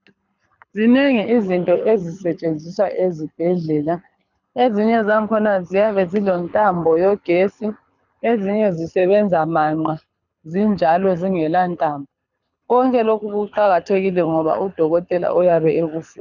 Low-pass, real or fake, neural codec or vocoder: 7.2 kHz; fake; codec, 24 kHz, 6 kbps, HILCodec